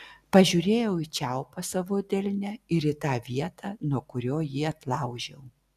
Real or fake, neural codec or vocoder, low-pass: real; none; 14.4 kHz